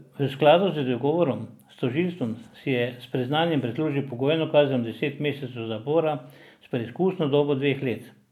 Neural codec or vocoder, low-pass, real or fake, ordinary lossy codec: none; 19.8 kHz; real; none